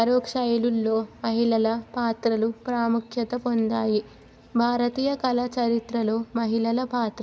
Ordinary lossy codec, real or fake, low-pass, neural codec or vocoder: none; real; none; none